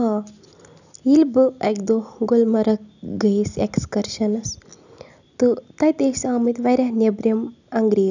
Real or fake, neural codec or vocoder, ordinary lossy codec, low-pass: real; none; none; 7.2 kHz